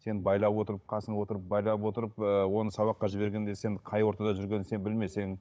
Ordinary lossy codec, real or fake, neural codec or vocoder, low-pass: none; fake; codec, 16 kHz, 16 kbps, FunCodec, trained on Chinese and English, 50 frames a second; none